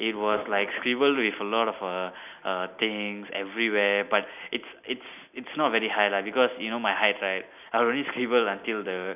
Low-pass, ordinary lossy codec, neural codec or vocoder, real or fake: 3.6 kHz; none; none; real